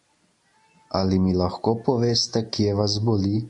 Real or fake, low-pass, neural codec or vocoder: real; 10.8 kHz; none